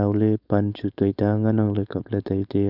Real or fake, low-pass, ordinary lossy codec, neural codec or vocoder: fake; 5.4 kHz; none; codec, 16 kHz, 4.8 kbps, FACodec